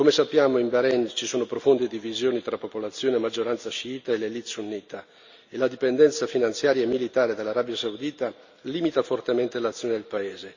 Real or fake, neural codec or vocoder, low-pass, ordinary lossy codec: real; none; 7.2 kHz; Opus, 64 kbps